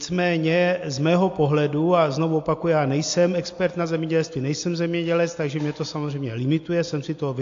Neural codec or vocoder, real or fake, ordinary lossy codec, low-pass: none; real; AAC, 48 kbps; 7.2 kHz